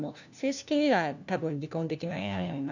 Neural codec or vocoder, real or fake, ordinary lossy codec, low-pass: codec, 16 kHz, 1 kbps, FunCodec, trained on LibriTTS, 50 frames a second; fake; none; 7.2 kHz